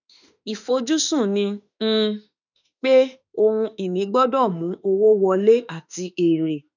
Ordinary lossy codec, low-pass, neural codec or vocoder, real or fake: none; 7.2 kHz; autoencoder, 48 kHz, 32 numbers a frame, DAC-VAE, trained on Japanese speech; fake